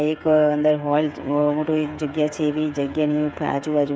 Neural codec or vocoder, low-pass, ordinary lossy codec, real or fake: codec, 16 kHz, 8 kbps, FreqCodec, smaller model; none; none; fake